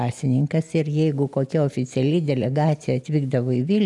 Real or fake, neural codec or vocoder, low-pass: real; none; 10.8 kHz